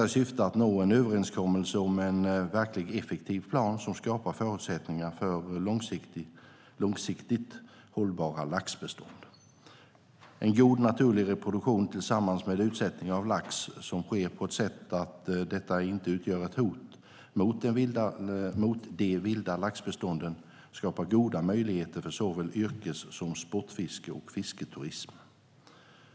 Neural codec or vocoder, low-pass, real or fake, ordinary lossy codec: none; none; real; none